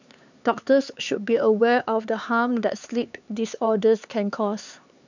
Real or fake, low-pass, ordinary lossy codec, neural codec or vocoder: fake; 7.2 kHz; none; codec, 16 kHz, 4 kbps, X-Codec, HuBERT features, trained on LibriSpeech